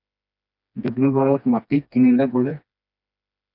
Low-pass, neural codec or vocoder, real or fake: 5.4 kHz; codec, 16 kHz, 2 kbps, FreqCodec, smaller model; fake